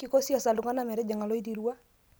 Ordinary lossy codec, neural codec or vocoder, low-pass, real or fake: none; none; none; real